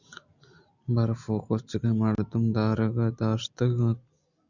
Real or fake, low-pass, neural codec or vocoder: real; 7.2 kHz; none